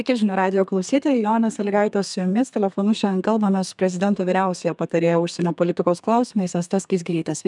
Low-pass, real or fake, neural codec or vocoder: 10.8 kHz; fake; codec, 32 kHz, 1.9 kbps, SNAC